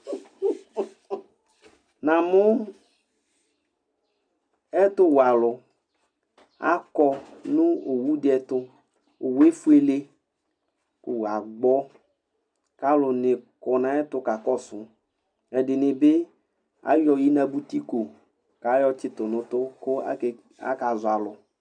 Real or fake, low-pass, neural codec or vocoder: real; 9.9 kHz; none